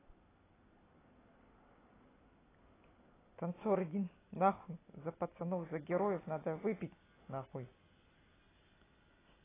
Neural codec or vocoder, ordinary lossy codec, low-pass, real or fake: none; AAC, 16 kbps; 3.6 kHz; real